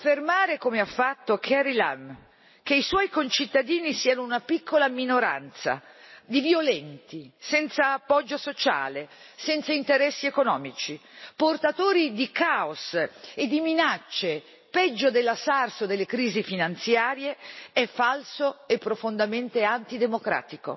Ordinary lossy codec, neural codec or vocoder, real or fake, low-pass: MP3, 24 kbps; none; real; 7.2 kHz